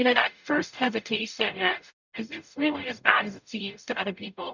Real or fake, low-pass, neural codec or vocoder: fake; 7.2 kHz; codec, 44.1 kHz, 0.9 kbps, DAC